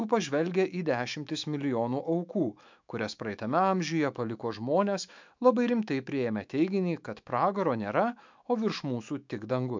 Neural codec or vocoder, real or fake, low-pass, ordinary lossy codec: autoencoder, 48 kHz, 128 numbers a frame, DAC-VAE, trained on Japanese speech; fake; 7.2 kHz; MP3, 64 kbps